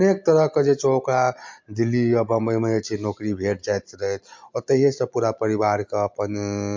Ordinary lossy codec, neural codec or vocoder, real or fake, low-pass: MP3, 48 kbps; none; real; 7.2 kHz